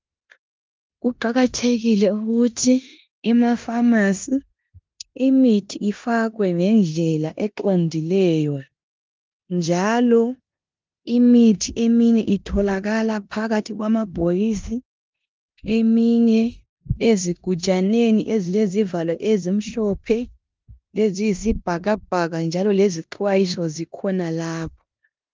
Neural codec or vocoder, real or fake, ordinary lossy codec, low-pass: codec, 16 kHz in and 24 kHz out, 0.9 kbps, LongCat-Audio-Codec, four codebook decoder; fake; Opus, 24 kbps; 7.2 kHz